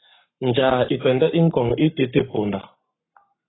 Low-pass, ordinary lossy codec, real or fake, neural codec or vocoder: 7.2 kHz; AAC, 16 kbps; fake; codec, 44.1 kHz, 7.8 kbps, Pupu-Codec